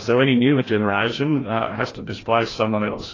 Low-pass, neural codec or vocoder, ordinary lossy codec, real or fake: 7.2 kHz; codec, 16 kHz, 1 kbps, FreqCodec, larger model; AAC, 32 kbps; fake